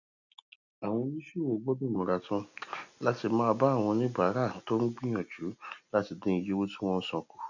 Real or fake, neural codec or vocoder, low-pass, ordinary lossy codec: real; none; 7.2 kHz; none